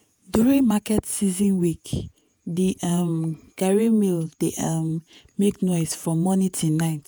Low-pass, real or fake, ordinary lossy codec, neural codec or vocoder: none; fake; none; vocoder, 48 kHz, 128 mel bands, Vocos